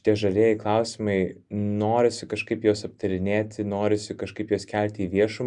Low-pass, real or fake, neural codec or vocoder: 10.8 kHz; real; none